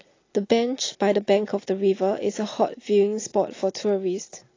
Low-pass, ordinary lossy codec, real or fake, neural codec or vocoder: 7.2 kHz; AAC, 32 kbps; real; none